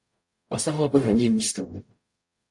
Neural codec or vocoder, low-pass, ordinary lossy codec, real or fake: codec, 44.1 kHz, 0.9 kbps, DAC; 10.8 kHz; AAC, 64 kbps; fake